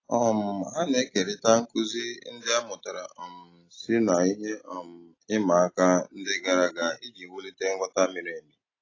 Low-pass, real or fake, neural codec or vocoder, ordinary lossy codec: 7.2 kHz; real; none; AAC, 32 kbps